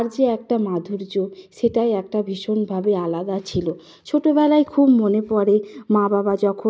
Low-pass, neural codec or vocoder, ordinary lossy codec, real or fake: none; none; none; real